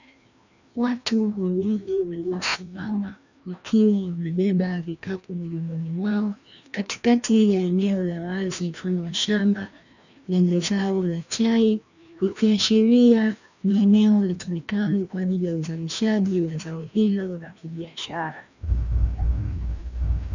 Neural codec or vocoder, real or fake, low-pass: codec, 16 kHz, 1 kbps, FreqCodec, larger model; fake; 7.2 kHz